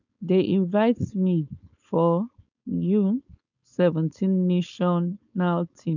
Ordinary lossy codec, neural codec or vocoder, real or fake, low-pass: none; codec, 16 kHz, 4.8 kbps, FACodec; fake; 7.2 kHz